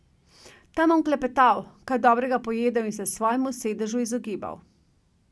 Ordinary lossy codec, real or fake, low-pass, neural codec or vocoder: none; real; none; none